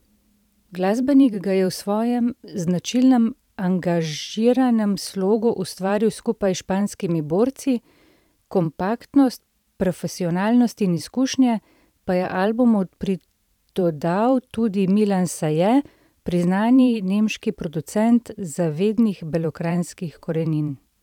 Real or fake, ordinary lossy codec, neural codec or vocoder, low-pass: fake; none; vocoder, 44.1 kHz, 128 mel bands every 512 samples, BigVGAN v2; 19.8 kHz